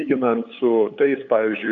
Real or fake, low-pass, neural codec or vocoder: fake; 7.2 kHz; codec, 16 kHz, 8 kbps, FunCodec, trained on LibriTTS, 25 frames a second